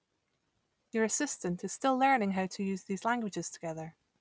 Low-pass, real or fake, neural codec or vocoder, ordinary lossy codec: none; real; none; none